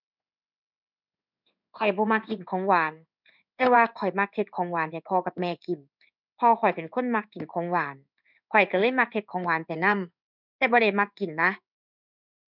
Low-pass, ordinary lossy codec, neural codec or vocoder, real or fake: 5.4 kHz; none; codec, 16 kHz in and 24 kHz out, 1 kbps, XY-Tokenizer; fake